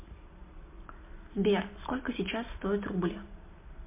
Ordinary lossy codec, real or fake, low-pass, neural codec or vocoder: MP3, 32 kbps; real; 3.6 kHz; none